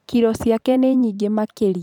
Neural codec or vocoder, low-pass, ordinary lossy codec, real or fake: vocoder, 44.1 kHz, 128 mel bands every 512 samples, BigVGAN v2; 19.8 kHz; none; fake